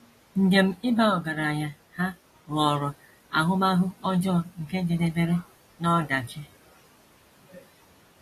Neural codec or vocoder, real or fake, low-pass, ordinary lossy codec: none; real; 14.4 kHz; AAC, 48 kbps